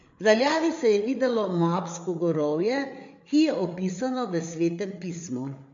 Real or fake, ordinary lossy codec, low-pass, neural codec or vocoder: fake; MP3, 48 kbps; 7.2 kHz; codec, 16 kHz, 8 kbps, FreqCodec, larger model